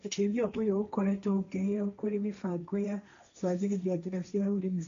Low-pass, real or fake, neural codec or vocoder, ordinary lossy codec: 7.2 kHz; fake; codec, 16 kHz, 1.1 kbps, Voila-Tokenizer; AAC, 64 kbps